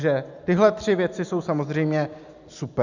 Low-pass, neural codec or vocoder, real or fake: 7.2 kHz; none; real